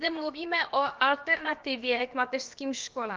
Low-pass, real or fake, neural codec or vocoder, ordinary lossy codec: 7.2 kHz; fake; codec, 16 kHz, about 1 kbps, DyCAST, with the encoder's durations; Opus, 24 kbps